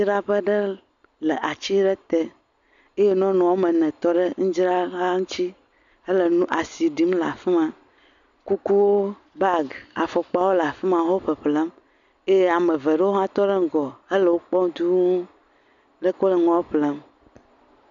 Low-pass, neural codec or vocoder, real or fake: 7.2 kHz; none; real